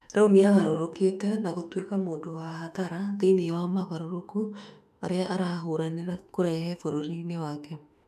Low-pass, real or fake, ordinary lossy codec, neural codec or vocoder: 14.4 kHz; fake; none; autoencoder, 48 kHz, 32 numbers a frame, DAC-VAE, trained on Japanese speech